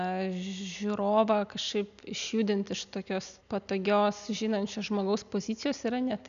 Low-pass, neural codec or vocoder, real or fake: 7.2 kHz; none; real